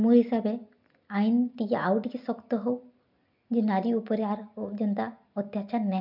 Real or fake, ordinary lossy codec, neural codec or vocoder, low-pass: real; MP3, 48 kbps; none; 5.4 kHz